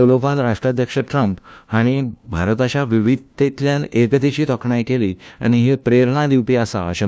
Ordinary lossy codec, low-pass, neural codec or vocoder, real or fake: none; none; codec, 16 kHz, 1 kbps, FunCodec, trained on LibriTTS, 50 frames a second; fake